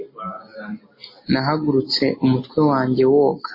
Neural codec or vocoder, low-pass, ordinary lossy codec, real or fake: none; 5.4 kHz; MP3, 24 kbps; real